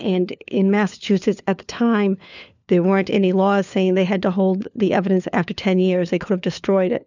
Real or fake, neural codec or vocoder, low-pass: fake; codec, 16 kHz, 4 kbps, FunCodec, trained on LibriTTS, 50 frames a second; 7.2 kHz